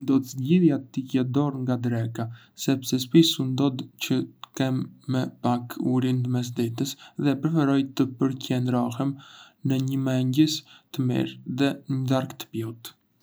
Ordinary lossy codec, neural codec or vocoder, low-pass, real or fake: none; none; none; real